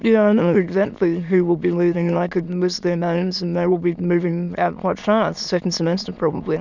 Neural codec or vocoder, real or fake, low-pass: autoencoder, 22.05 kHz, a latent of 192 numbers a frame, VITS, trained on many speakers; fake; 7.2 kHz